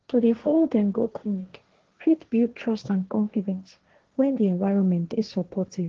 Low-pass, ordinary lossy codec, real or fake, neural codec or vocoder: 7.2 kHz; Opus, 16 kbps; fake; codec, 16 kHz, 1.1 kbps, Voila-Tokenizer